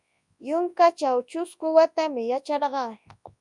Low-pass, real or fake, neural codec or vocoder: 10.8 kHz; fake; codec, 24 kHz, 0.9 kbps, WavTokenizer, large speech release